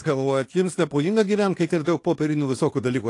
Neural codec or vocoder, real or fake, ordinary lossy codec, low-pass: codec, 24 kHz, 0.9 kbps, WavTokenizer, small release; fake; AAC, 48 kbps; 10.8 kHz